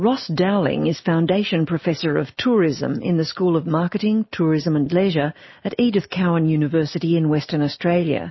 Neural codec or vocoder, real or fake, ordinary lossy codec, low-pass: none; real; MP3, 24 kbps; 7.2 kHz